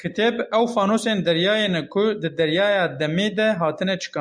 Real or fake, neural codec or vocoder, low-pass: real; none; 9.9 kHz